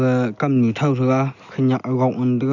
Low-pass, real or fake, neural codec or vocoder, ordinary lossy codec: 7.2 kHz; real; none; none